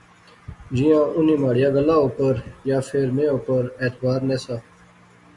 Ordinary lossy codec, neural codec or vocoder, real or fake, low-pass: Opus, 64 kbps; none; real; 10.8 kHz